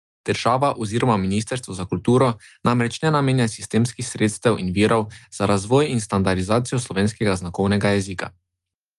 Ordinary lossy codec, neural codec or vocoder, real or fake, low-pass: Opus, 32 kbps; none; real; 10.8 kHz